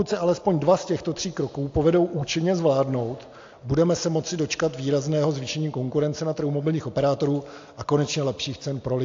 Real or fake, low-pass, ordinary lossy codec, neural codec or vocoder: real; 7.2 kHz; AAC, 48 kbps; none